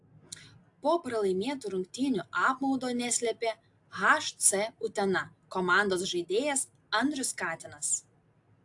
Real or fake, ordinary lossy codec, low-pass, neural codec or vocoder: real; AAC, 64 kbps; 10.8 kHz; none